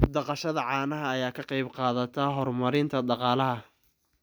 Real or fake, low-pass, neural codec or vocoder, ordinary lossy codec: real; none; none; none